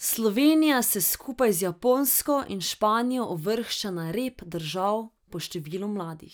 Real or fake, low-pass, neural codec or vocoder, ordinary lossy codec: real; none; none; none